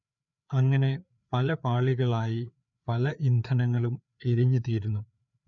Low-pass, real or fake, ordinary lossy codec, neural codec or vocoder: 7.2 kHz; fake; none; codec, 16 kHz, 4 kbps, FreqCodec, larger model